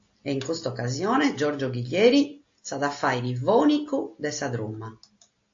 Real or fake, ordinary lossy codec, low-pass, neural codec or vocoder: real; AAC, 48 kbps; 7.2 kHz; none